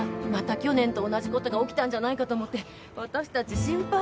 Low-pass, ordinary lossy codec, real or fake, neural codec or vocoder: none; none; real; none